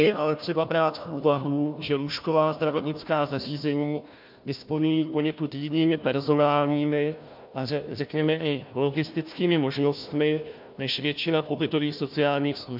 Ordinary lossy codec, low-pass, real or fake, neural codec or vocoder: MP3, 48 kbps; 5.4 kHz; fake; codec, 16 kHz, 1 kbps, FunCodec, trained on Chinese and English, 50 frames a second